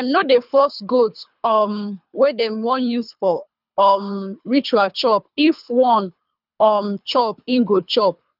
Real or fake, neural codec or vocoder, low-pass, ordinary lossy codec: fake; codec, 24 kHz, 3 kbps, HILCodec; 5.4 kHz; none